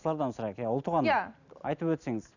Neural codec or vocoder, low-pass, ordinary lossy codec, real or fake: none; 7.2 kHz; none; real